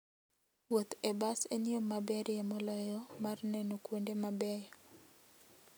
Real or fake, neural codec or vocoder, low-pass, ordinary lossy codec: real; none; none; none